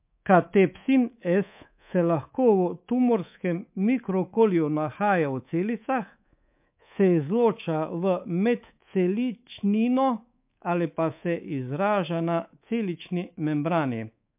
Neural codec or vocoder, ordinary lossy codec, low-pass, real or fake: codec, 24 kHz, 3.1 kbps, DualCodec; MP3, 32 kbps; 3.6 kHz; fake